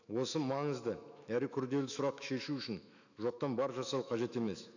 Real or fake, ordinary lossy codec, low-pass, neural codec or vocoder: fake; none; 7.2 kHz; autoencoder, 48 kHz, 128 numbers a frame, DAC-VAE, trained on Japanese speech